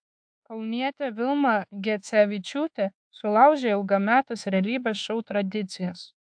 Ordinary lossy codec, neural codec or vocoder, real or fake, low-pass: AAC, 64 kbps; codec, 24 kHz, 1.2 kbps, DualCodec; fake; 9.9 kHz